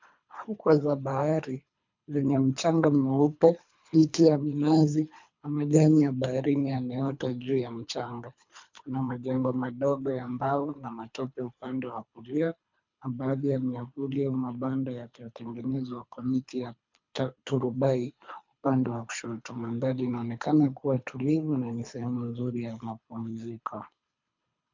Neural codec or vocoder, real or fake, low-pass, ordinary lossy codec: codec, 24 kHz, 3 kbps, HILCodec; fake; 7.2 kHz; MP3, 64 kbps